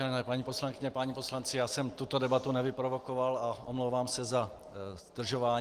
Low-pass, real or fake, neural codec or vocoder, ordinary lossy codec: 14.4 kHz; real; none; Opus, 24 kbps